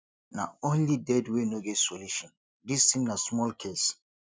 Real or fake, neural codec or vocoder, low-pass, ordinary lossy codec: real; none; none; none